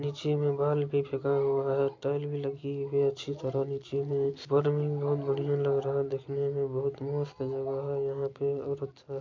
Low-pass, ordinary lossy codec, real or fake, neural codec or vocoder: 7.2 kHz; MP3, 48 kbps; real; none